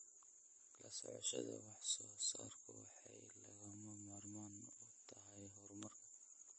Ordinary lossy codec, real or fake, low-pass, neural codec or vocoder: MP3, 32 kbps; real; 10.8 kHz; none